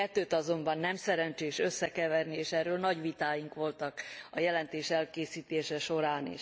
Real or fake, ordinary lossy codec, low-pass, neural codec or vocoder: real; none; none; none